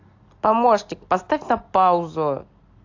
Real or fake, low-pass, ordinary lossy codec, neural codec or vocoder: real; 7.2 kHz; AAC, 48 kbps; none